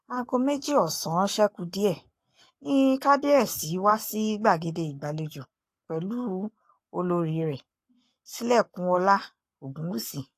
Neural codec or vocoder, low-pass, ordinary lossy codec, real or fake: codec, 44.1 kHz, 7.8 kbps, Pupu-Codec; 14.4 kHz; AAC, 64 kbps; fake